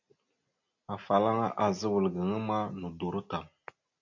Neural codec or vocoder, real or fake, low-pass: none; real; 7.2 kHz